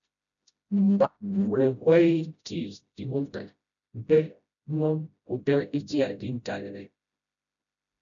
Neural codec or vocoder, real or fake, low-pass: codec, 16 kHz, 0.5 kbps, FreqCodec, smaller model; fake; 7.2 kHz